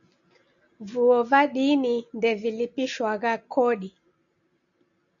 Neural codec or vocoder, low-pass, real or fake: none; 7.2 kHz; real